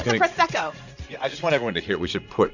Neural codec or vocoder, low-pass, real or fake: vocoder, 44.1 kHz, 80 mel bands, Vocos; 7.2 kHz; fake